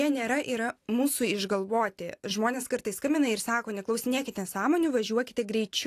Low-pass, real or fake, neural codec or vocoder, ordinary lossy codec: 14.4 kHz; real; none; AAC, 64 kbps